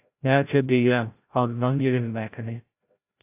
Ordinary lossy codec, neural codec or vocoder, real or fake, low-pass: AAC, 32 kbps; codec, 16 kHz, 0.5 kbps, FreqCodec, larger model; fake; 3.6 kHz